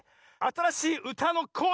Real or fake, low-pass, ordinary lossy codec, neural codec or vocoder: real; none; none; none